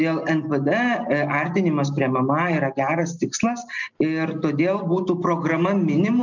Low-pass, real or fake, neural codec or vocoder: 7.2 kHz; real; none